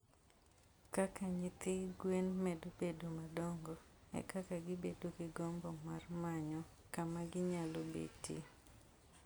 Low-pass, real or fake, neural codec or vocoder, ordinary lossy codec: none; real; none; none